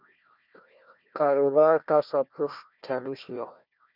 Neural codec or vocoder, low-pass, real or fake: codec, 16 kHz, 1 kbps, FunCodec, trained on Chinese and English, 50 frames a second; 5.4 kHz; fake